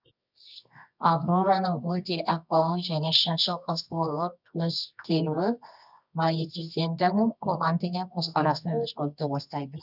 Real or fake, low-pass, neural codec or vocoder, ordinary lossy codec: fake; 5.4 kHz; codec, 24 kHz, 0.9 kbps, WavTokenizer, medium music audio release; none